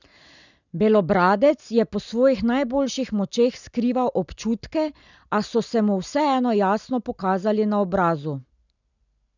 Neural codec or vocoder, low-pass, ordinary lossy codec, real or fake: none; 7.2 kHz; none; real